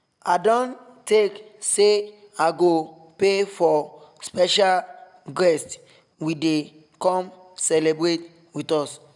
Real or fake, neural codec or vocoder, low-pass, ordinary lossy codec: real; none; 10.8 kHz; none